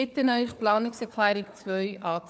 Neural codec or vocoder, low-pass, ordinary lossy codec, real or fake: codec, 16 kHz, 4 kbps, FunCodec, trained on Chinese and English, 50 frames a second; none; none; fake